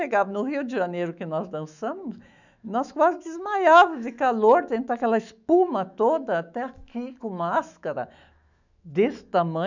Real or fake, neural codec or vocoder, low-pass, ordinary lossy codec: fake; autoencoder, 48 kHz, 128 numbers a frame, DAC-VAE, trained on Japanese speech; 7.2 kHz; none